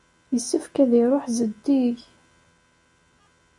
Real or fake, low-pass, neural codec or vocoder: real; 10.8 kHz; none